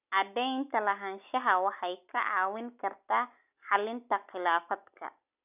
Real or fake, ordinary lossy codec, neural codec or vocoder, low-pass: real; none; none; 3.6 kHz